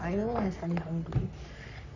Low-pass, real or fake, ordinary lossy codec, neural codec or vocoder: 7.2 kHz; fake; none; codec, 44.1 kHz, 3.4 kbps, Pupu-Codec